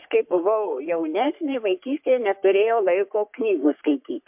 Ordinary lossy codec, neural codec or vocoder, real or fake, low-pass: MP3, 32 kbps; codec, 44.1 kHz, 3.4 kbps, Pupu-Codec; fake; 3.6 kHz